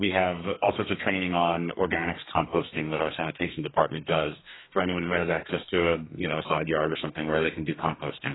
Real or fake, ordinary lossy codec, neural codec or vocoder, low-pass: fake; AAC, 16 kbps; codec, 32 kHz, 1.9 kbps, SNAC; 7.2 kHz